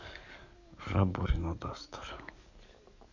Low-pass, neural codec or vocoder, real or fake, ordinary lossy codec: 7.2 kHz; codec, 44.1 kHz, 7.8 kbps, DAC; fake; none